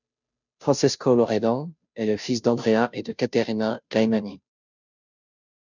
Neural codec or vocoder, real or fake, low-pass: codec, 16 kHz, 0.5 kbps, FunCodec, trained on Chinese and English, 25 frames a second; fake; 7.2 kHz